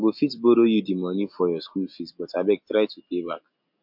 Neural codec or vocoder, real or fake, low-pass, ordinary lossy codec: none; real; 5.4 kHz; MP3, 48 kbps